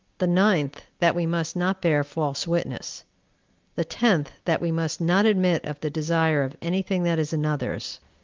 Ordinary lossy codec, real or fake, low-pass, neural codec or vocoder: Opus, 24 kbps; real; 7.2 kHz; none